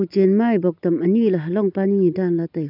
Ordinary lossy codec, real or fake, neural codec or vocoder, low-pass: none; real; none; 5.4 kHz